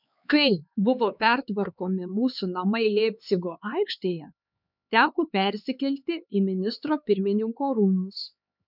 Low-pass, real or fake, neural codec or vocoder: 5.4 kHz; fake; codec, 16 kHz, 4 kbps, X-Codec, HuBERT features, trained on LibriSpeech